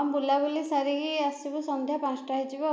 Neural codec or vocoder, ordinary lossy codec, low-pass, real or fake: none; none; none; real